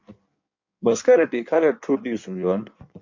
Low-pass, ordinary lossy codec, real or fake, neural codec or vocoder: 7.2 kHz; MP3, 48 kbps; fake; codec, 16 kHz in and 24 kHz out, 1.1 kbps, FireRedTTS-2 codec